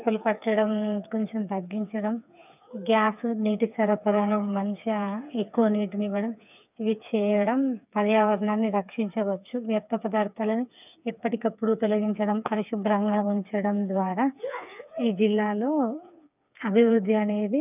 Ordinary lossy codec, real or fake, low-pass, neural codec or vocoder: none; fake; 3.6 kHz; codec, 16 kHz, 4 kbps, FreqCodec, smaller model